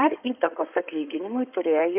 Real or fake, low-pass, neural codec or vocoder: fake; 3.6 kHz; codec, 16 kHz in and 24 kHz out, 2.2 kbps, FireRedTTS-2 codec